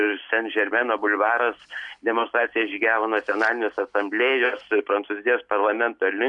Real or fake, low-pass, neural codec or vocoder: real; 10.8 kHz; none